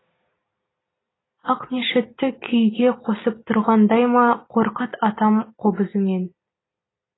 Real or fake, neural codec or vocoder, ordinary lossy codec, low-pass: real; none; AAC, 16 kbps; 7.2 kHz